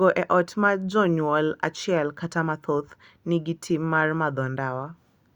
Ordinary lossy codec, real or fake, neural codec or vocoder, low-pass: Opus, 64 kbps; real; none; 19.8 kHz